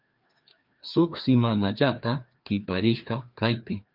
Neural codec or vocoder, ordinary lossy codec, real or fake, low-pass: codec, 16 kHz, 2 kbps, FreqCodec, larger model; Opus, 24 kbps; fake; 5.4 kHz